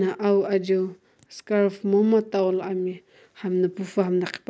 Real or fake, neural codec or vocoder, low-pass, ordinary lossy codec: real; none; none; none